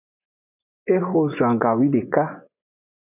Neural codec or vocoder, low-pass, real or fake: vocoder, 24 kHz, 100 mel bands, Vocos; 3.6 kHz; fake